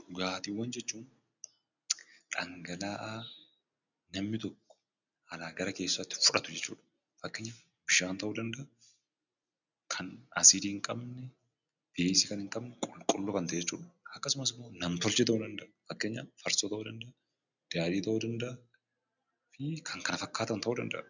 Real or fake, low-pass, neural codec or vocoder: real; 7.2 kHz; none